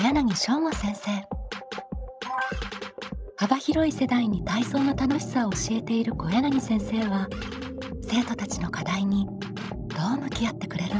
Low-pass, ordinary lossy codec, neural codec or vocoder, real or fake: none; none; codec, 16 kHz, 16 kbps, FreqCodec, larger model; fake